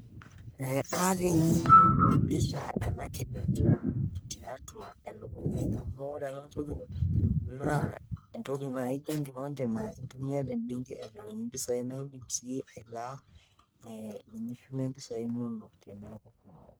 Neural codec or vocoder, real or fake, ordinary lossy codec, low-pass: codec, 44.1 kHz, 1.7 kbps, Pupu-Codec; fake; none; none